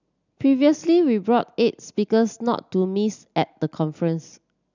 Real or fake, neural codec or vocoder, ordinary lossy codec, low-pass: real; none; none; 7.2 kHz